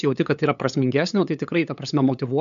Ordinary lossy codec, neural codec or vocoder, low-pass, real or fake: MP3, 96 kbps; codec, 16 kHz, 8 kbps, FunCodec, trained on LibriTTS, 25 frames a second; 7.2 kHz; fake